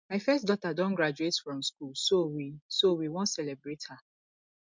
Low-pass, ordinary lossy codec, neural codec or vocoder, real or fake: 7.2 kHz; MP3, 64 kbps; none; real